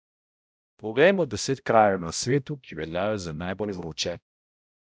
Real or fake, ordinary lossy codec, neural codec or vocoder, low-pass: fake; none; codec, 16 kHz, 0.5 kbps, X-Codec, HuBERT features, trained on balanced general audio; none